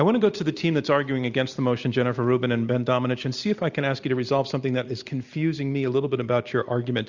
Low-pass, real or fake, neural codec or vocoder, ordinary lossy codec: 7.2 kHz; real; none; Opus, 64 kbps